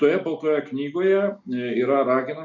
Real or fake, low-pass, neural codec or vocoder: real; 7.2 kHz; none